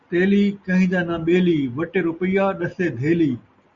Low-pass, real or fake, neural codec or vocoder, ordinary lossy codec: 7.2 kHz; real; none; Opus, 64 kbps